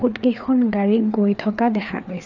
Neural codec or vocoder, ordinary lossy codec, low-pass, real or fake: codec, 16 kHz, 4 kbps, FreqCodec, larger model; none; 7.2 kHz; fake